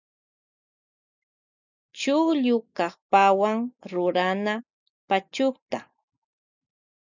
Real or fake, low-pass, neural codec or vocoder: real; 7.2 kHz; none